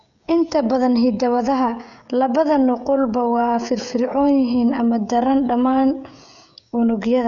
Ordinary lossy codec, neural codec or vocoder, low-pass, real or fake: none; codec, 16 kHz, 16 kbps, FreqCodec, smaller model; 7.2 kHz; fake